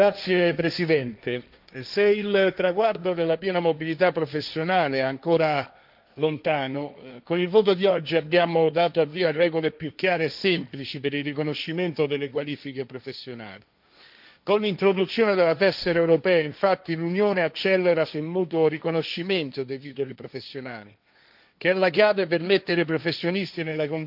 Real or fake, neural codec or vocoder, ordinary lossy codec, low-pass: fake; codec, 16 kHz, 1.1 kbps, Voila-Tokenizer; none; 5.4 kHz